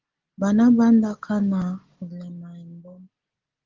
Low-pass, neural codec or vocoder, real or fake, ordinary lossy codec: 7.2 kHz; none; real; Opus, 16 kbps